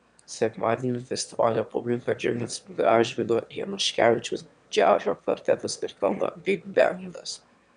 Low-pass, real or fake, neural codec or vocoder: 9.9 kHz; fake; autoencoder, 22.05 kHz, a latent of 192 numbers a frame, VITS, trained on one speaker